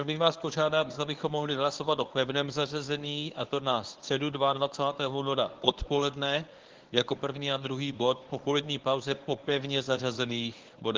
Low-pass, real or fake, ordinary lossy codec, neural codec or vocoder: 7.2 kHz; fake; Opus, 16 kbps; codec, 24 kHz, 0.9 kbps, WavTokenizer, medium speech release version 1